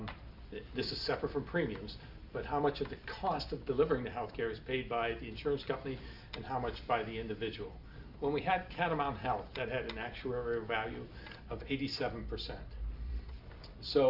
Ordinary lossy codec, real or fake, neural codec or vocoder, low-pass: Opus, 64 kbps; real; none; 5.4 kHz